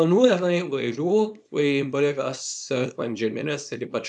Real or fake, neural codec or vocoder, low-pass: fake; codec, 24 kHz, 0.9 kbps, WavTokenizer, small release; 10.8 kHz